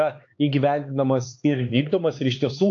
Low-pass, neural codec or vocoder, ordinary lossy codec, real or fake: 7.2 kHz; codec, 16 kHz, 4 kbps, X-Codec, HuBERT features, trained on LibriSpeech; AAC, 48 kbps; fake